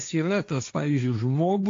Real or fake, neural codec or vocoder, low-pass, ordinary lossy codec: fake; codec, 16 kHz, 1.1 kbps, Voila-Tokenizer; 7.2 kHz; AAC, 48 kbps